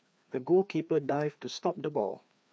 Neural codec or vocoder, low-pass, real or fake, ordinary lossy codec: codec, 16 kHz, 2 kbps, FreqCodec, larger model; none; fake; none